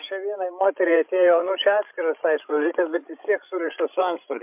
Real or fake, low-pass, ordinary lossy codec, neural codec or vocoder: fake; 3.6 kHz; MP3, 32 kbps; codec, 16 kHz, 16 kbps, FreqCodec, larger model